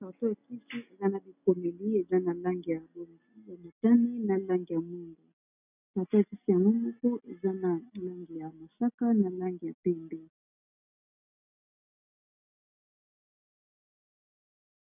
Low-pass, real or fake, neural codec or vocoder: 3.6 kHz; real; none